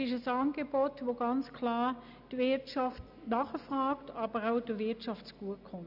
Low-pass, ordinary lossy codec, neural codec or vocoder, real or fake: 5.4 kHz; none; none; real